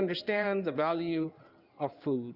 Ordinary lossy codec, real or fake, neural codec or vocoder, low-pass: Opus, 64 kbps; fake; codec, 16 kHz in and 24 kHz out, 2.2 kbps, FireRedTTS-2 codec; 5.4 kHz